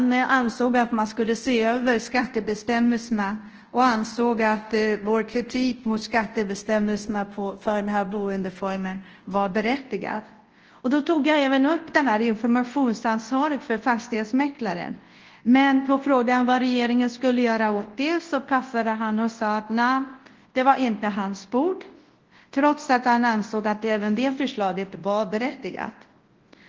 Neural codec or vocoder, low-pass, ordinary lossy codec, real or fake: codec, 24 kHz, 0.9 kbps, WavTokenizer, large speech release; 7.2 kHz; Opus, 16 kbps; fake